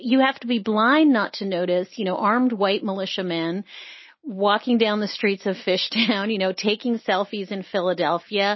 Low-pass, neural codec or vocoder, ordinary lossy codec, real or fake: 7.2 kHz; none; MP3, 24 kbps; real